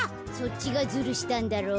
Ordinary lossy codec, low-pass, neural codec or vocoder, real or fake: none; none; none; real